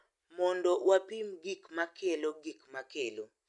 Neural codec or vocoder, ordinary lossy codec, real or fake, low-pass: none; none; real; 10.8 kHz